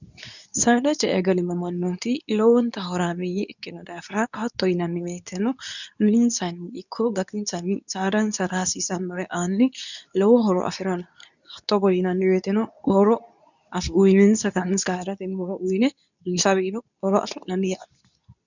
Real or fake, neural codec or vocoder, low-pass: fake; codec, 24 kHz, 0.9 kbps, WavTokenizer, medium speech release version 2; 7.2 kHz